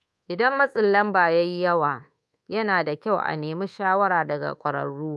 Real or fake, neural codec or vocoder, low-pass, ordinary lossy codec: fake; codec, 24 kHz, 1.2 kbps, DualCodec; none; none